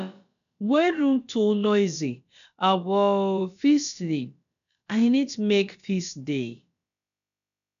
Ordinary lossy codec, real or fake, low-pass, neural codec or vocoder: none; fake; 7.2 kHz; codec, 16 kHz, about 1 kbps, DyCAST, with the encoder's durations